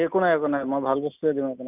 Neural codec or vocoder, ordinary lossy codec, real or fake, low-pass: none; none; real; 3.6 kHz